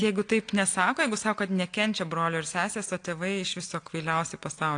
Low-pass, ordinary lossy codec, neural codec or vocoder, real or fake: 9.9 kHz; AAC, 48 kbps; none; real